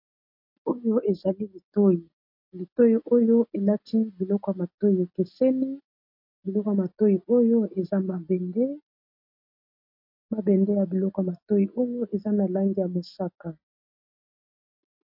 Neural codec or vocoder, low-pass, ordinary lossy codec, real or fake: none; 5.4 kHz; MP3, 32 kbps; real